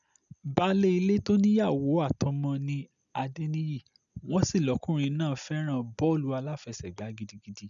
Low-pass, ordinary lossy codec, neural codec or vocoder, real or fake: 7.2 kHz; none; none; real